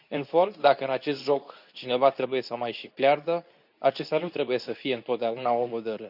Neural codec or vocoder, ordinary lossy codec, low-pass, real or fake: codec, 24 kHz, 0.9 kbps, WavTokenizer, medium speech release version 2; none; 5.4 kHz; fake